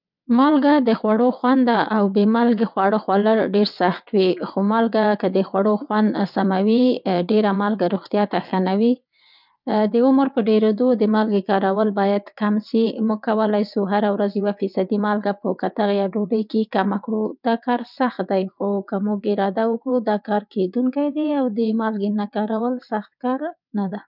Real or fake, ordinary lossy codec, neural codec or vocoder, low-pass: fake; none; vocoder, 22.05 kHz, 80 mel bands, WaveNeXt; 5.4 kHz